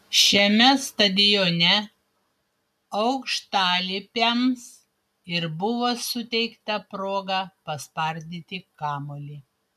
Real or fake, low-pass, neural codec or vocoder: real; 14.4 kHz; none